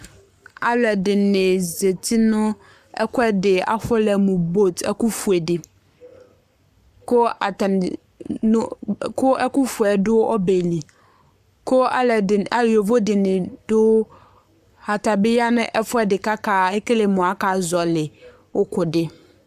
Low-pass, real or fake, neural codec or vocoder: 14.4 kHz; fake; codec, 44.1 kHz, 7.8 kbps, Pupu-Codec